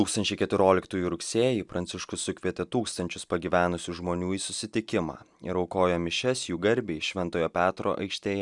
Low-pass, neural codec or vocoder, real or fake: 10.8 kHz; none; real